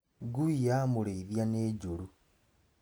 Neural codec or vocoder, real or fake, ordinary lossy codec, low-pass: none; real; none; none